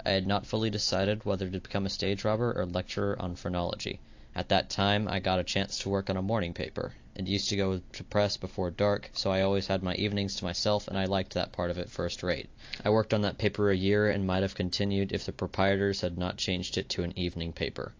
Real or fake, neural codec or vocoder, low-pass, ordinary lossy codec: real; none; 7.2 kHz; AAC, 48 kbps